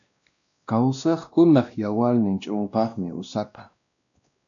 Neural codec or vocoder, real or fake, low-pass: codec, 16 kHz, 1 kbps, X-Codec, WavLM features, trained on Multilingual LibriSpeech; fake; 7.2 kHz